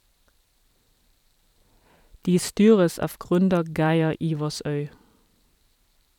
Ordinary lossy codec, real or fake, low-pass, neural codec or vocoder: none; fake; 19.8 kHz; vocoder, 44.1 kHz, 128 mel bands every 512 samples, BigVGAN v2